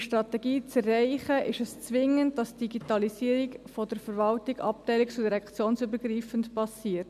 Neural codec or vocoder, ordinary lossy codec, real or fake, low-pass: none; none; real; 14.4 kHz